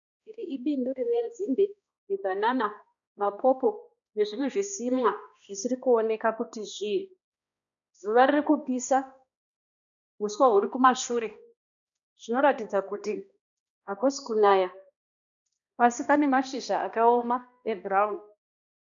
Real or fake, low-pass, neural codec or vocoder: fake; 7.2 kHz; codec, 16 kHz, 1 kbps, X-Codec, HuBERT features, trained on balanced general audio